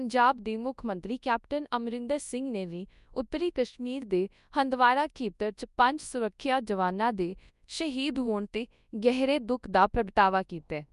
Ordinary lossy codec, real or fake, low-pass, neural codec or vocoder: none; fake; 10.8 kHz; codec, 24 kHz, 0.9 kbps, WavTokenizer, large speech release